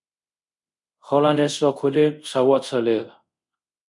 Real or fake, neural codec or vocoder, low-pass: fake; codec, 24 kHz, 0.5 kbps, DualCodec; 10.8 kHz